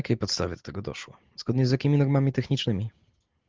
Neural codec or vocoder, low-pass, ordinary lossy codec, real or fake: none; 7.2 kHz; Opus, 16 kbps; real